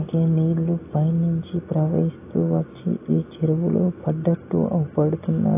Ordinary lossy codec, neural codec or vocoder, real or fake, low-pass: AAC, 24 kbps; none; real; 3.6 kHz